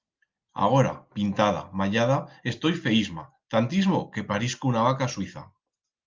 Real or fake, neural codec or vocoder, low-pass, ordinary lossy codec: real; none; 7.2 kHz; Opus, 32 kbps